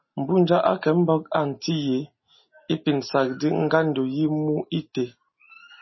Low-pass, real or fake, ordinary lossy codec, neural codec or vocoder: 7.2 kHz; real; MP3, 24 kbps; none